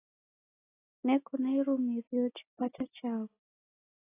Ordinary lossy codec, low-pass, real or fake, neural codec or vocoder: AAC, 16 kbps; 3.6 kHz; real; none